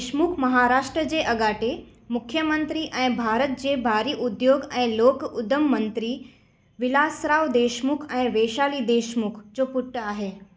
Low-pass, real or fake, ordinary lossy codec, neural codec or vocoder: none; real; none; none